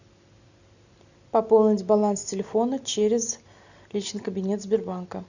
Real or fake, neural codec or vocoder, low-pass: real; none; 7.2 kHz